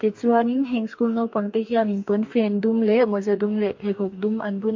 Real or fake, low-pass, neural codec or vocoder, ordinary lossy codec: fake; 7.2 kHz; codec, 44.1 kHz, 2.6 kbps, DAC; MP3, 48 kbps